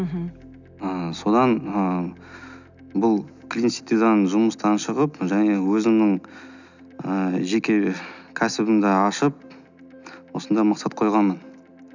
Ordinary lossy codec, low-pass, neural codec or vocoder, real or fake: none; 7.2 kHz; none; real